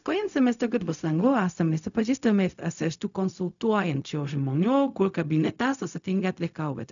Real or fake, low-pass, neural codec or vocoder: fake; 7.2 kHz; codec, 16 kHz, 0.4 kbps, LongCat-Audio-Codec